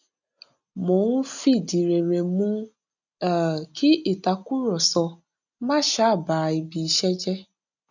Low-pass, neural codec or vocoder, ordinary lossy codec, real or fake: 7.2 kHz; none; none; real